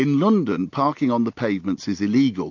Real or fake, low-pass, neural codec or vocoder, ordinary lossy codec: real; 7.2 kHz; none; AAC, 48 kbps